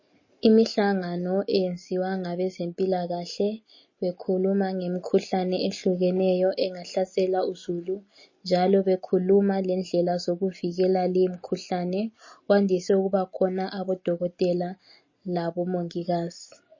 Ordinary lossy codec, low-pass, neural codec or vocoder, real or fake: MP3, 32 kbps; 7.2 kHz; none; real